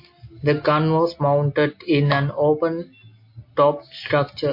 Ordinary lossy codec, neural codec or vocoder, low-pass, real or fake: MP3, 32 kbps; none; 5.4 kHz; real